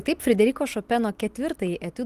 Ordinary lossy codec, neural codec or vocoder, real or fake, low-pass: Opus, 24 kbps; none; real; 14.4 kHz